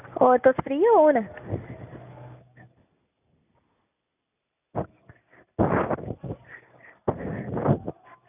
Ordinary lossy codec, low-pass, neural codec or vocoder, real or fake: none; 3.6 kHz; none; real